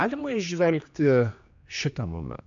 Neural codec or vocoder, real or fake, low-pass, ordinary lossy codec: codec, 16 kHz, 2 kbps, X-Codec, HuBERT features, trained on general audio; fake; 7.2 kHz; AAC, 64 kbps